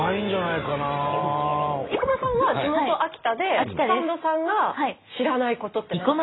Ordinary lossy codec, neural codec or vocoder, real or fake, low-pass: AAC, 16 kbps; none; real; 7.2 kHz